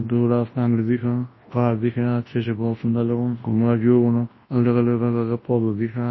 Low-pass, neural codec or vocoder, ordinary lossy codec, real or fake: 7.2 kHz; codec, 24 kHz, 0.9 kbps, WavTokenizer, large speech release; MP3, 24 kbps; fake